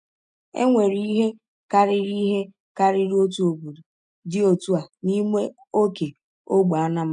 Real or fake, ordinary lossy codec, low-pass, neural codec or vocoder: real; none; 9.9 kHz; none